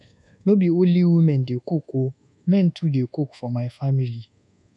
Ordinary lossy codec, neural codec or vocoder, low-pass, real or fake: none; codec, 24 kHz, 1.2 kbps, DualCodec; 10.8 kHz; fake